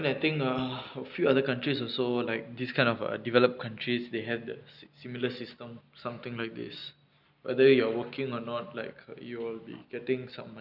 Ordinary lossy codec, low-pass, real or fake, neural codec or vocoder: none; 5.4 kHz; real; none